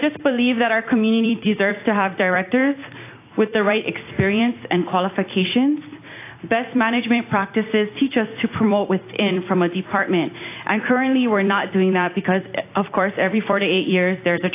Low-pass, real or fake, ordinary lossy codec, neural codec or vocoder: 3.6 kHz; fake; AAC, 24 kbps; vocoder, 44.1 kHz, 128 mel bands every 256 samples, BigVGAN v2